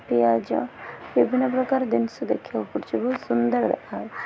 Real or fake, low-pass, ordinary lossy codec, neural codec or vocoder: real; none; none; none